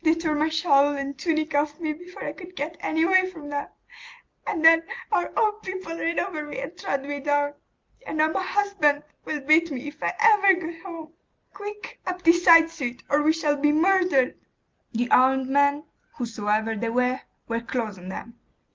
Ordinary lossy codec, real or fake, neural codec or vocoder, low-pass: Opus, 32 kbps; real; none; 7.2 kHz